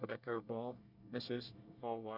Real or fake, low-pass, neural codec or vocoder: fake; 5.4 kHz; codec, 24 kHz, 1 kbps, SNAC